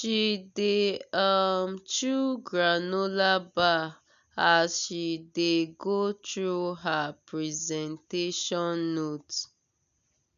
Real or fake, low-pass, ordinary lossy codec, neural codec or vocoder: real; 7.2 kHz; none; none